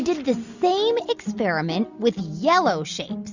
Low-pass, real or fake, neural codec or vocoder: 7.2 kHz; real; none